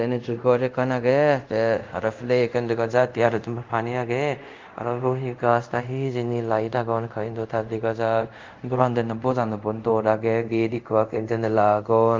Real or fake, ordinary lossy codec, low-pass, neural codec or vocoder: fake; Opus, 32 kbps; 7.2 kHz; codec, 24 kHz, 0.5 kbps, DualCodec